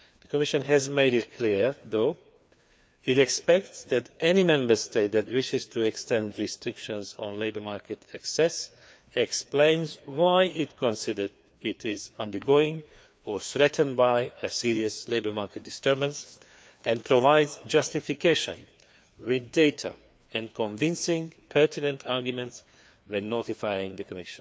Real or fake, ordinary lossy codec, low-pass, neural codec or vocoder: fake; none; none; codec, 16 kHz, 2 kbps, FreqCodec, larger model